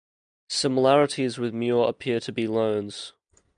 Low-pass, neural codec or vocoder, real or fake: 9.9 kHz; none; real